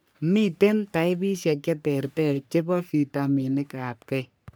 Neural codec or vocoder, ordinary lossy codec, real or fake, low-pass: codec, 44.1 kHz, 3.4 kbps, Pupu-Codec; none; fake; none